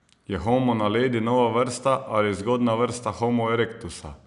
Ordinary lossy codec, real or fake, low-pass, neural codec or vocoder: none; real; 10.8 kHz; none